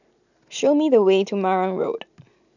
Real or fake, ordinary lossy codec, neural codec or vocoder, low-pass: real; none; none; 7.2 kHz